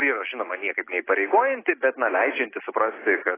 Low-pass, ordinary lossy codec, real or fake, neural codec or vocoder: 3.6 kHz; AAC, 16 kbps; real; none